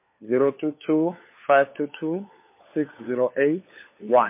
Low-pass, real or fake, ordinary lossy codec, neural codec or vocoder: 3.6 kHz; fake; MP3, 24 kbps; codec, 16 kHz, 4 kbps, FunCodec, trained on LibriTTS, 50 frames a second